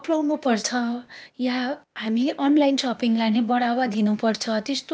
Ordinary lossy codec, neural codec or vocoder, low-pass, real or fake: none; codec, 16 kHz, 0.8 kbps, ZipCodec; none; fake